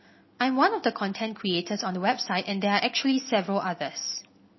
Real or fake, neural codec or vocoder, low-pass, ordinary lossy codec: real; none; 7.2 kHz; MP3, 24 kbps